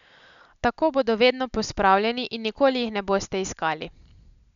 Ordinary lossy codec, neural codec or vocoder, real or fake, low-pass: none; none; real; 7.2 kHz